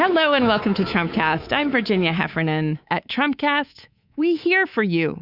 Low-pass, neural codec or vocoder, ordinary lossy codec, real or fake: 5.4 kHz; codec, 24 kHz, 3.1 kbps, DualCodec; Opus, 64 kbps; fake